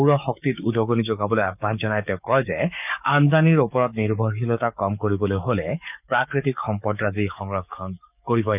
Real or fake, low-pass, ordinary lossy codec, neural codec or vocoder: fake; 3.6 kHz; none; codec, 44.1 kHz, 7.8 kbps, DAC